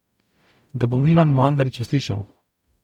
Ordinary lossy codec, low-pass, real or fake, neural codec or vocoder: none; 19.8 kHz; fake; codec, 44.1 kHz, 0.9 kbps, DAC